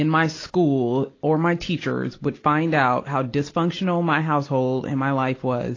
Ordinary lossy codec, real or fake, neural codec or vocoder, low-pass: AAC, 32 kbps; real; none; 7.2 kHz